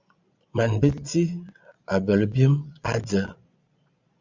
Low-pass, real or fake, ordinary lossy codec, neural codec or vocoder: 7.2 kHz; fake; Opus, 64 kbps; vocoder, 22.05 kHz, 80 mel bands, Vocos